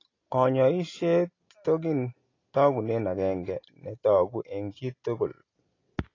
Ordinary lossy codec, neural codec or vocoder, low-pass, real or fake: AAC, 32 kbps; none; 7.2 kHz; real